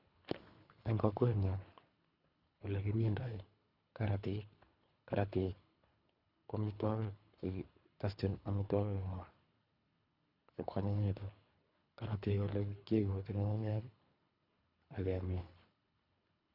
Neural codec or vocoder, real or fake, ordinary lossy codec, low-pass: codec, 24 kHz, 3 kbps, HILCodec; fake; none; 5.4 kHz